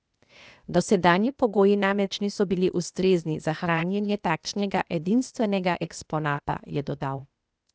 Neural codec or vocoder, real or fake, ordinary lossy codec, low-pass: codec, 16 kHz, 0.8 kbps, ZipCodec; fake; none; none